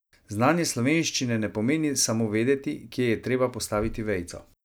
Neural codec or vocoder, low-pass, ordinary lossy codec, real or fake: none; none; none; real